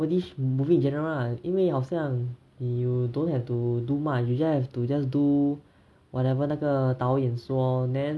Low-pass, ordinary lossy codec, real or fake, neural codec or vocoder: none; none; real; none